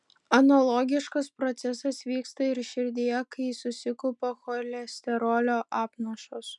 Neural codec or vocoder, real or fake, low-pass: none; real; 10.8 kHz